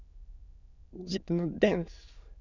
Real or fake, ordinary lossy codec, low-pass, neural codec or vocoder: fake; none; 7.2 kHz; autoencoder, 22.05 kHz, a latent of 192 numbers a frame, VITS, trained on many speakers